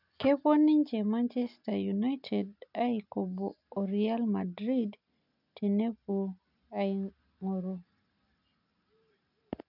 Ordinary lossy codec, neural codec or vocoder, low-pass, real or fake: none; none; 5.4 kHz; real